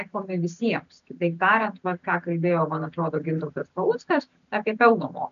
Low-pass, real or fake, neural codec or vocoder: 7.2 kHz; real; none